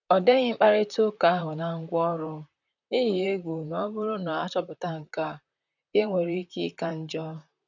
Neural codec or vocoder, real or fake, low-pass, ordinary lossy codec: vocoder, 44.1 kHz, 128 mel bands, Pupu-Vocoder; fake; 7.2 kHz; none